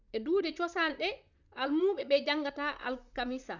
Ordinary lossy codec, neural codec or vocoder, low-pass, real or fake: none; vocoder, 44.1 kHz, 128 mel bands, Pupu-Vocoder; 7.2 kHz; fake